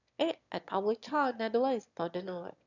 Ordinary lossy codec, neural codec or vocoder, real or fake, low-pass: none; autoencoder, 22.05 kHz, a latent of 192 numbers a frame, VITS, trained on one speaker; fake; 7.2 kHz